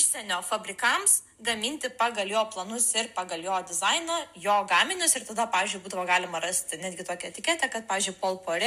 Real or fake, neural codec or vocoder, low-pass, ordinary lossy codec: real; none; 14.4 kHz; MP3, 96 kbps